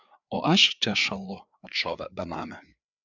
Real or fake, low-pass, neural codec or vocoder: fake; 7.2 kHz; codec, 16 kHz, 4 kbps, FreqCodec, larger model